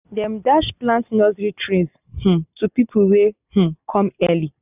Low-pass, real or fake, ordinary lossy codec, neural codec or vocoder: 3.6 kHz; real; none; none